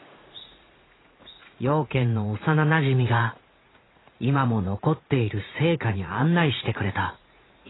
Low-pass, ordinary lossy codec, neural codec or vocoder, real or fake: 7.2 kHz; AAC, 16 kbps; vocoder, 44.1 kHz, 80 mel bands, Vocos; fake